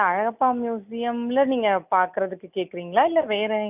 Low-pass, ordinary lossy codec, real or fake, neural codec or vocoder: 3.6 kHz; none; real; none